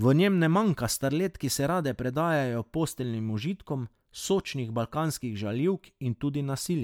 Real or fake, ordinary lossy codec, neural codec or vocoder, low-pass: real; MP3, 96 kbps; none; 19.8 kHz